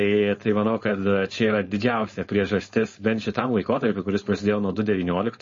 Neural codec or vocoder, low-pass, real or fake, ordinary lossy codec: codec, 16 kHz, 4.8 kbps, FACodec; 7.2 kHz; fake; MP3, 32 kbps